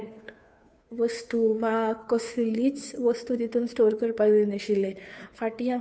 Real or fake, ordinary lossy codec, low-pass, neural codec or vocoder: fake; none; none; codec, 16 kHz, 2 kbps, FunCodec, trained on Chinese and English, 25 frames a second